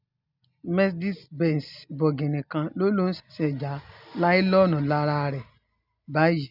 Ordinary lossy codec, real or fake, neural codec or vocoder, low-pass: none; real; none; 5.4 kHz